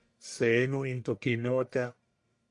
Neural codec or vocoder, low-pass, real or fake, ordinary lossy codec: codec, 44.1 kHz, 1.7 kbps, Pupu-Codec; 10.8 kHz; fake; MP3, 64 kbps